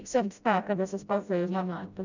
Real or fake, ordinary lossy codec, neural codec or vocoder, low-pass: fake; none; codec, 16 kHz, 0.5 kbps, FreqCodec, smaller model; 7.2 kHz